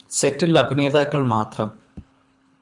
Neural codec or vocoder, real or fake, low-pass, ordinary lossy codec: codec, 24 kHz, 3 kbps, HILCodec; fake; 10.8 kHz; MP3, 96 kbps